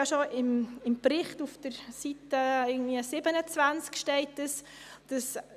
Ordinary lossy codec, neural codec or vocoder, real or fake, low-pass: none; none; real; 14.4 kHz